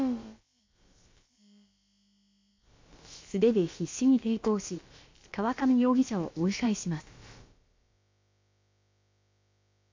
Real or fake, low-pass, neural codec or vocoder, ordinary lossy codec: fake; 7.2 kHz; codec, 16 kHz, about 1 kbps, DyCAST, with the encoder's durations; MP3, 48 kbps